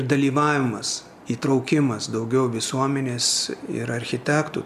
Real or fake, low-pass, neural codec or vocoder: real; 14.4 kHz; none